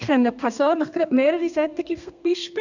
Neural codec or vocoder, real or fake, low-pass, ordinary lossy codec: codec, 32 kHz, 1.9 kbps, SNAC; fake; 7.2 kHz; none